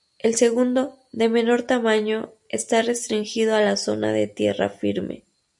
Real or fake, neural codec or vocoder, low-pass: real; none; 10.8 kHz